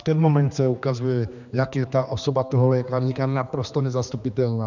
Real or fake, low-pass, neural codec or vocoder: fake; 7.2 kHz; codec, 16 kHz, 2 kbps, X-Codec, HuBERT features, trained on general audio